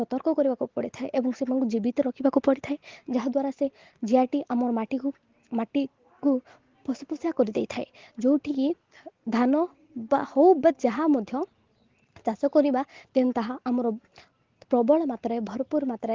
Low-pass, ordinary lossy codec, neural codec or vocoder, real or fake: 7.2 kHz; Opus, 16 kbps; none; real